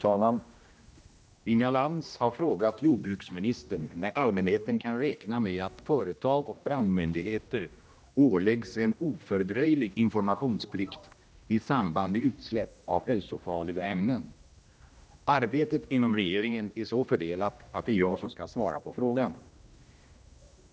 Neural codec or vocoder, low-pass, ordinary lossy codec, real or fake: codec, 16 kHz, 1 kbps, X-Codec, HuBERT features, trained on general audio; none; none; fake